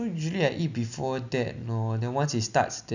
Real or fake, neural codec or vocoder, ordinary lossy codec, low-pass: real; none; none; 7.2 kHz